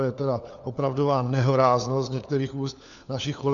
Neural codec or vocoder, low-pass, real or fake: codec, 16 kHz, 4 kbps, FunCodec, trained on LibriTTS, 50 frames a second; 7.2 kHz; fake